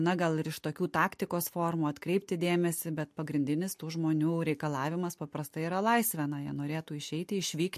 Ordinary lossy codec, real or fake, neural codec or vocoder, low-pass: MP3, 64 kbps; real; none; 14.4 kHz